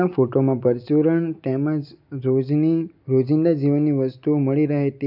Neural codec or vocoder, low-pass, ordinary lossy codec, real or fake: none; 5.4 kHz; none; real